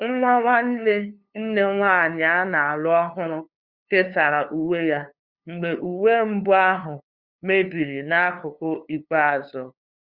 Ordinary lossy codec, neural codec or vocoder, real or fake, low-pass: Opus, 64 kbps; codec, 16 kHz, 2 kbps, FunCodec, trained on LibriTTS, 25 frames a second; fake; 5.4 kHz